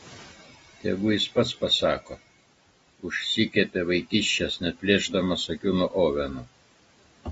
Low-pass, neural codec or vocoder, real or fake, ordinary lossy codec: 19.8 kHz; none; real; AAC, 24 kbps